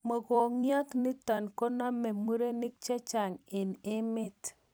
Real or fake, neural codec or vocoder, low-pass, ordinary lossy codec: fake; vocoder, 44.1 kHz, 128 mel bands every 256 samples, BigVGAN v2; none; none